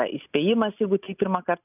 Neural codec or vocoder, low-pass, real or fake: none; 3.6 kHz; real